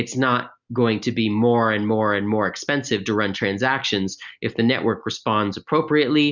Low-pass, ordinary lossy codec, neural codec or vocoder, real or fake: 7.2 kHz; Opus, 64 kbps; none; real